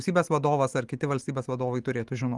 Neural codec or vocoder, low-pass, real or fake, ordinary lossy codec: none; 10.8 kHz; real; Opus, 24 kbps